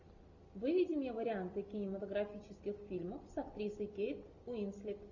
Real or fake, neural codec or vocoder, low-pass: real; none; 7.2 kHz